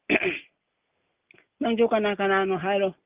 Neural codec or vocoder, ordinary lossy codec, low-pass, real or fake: none; Opus, 24 kbps; 3.6 kHz; real